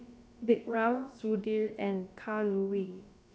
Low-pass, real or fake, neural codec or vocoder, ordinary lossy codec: none; fake; codec, 16 kHz, about 1 kbps, DyCAST, with the encoder's durations; none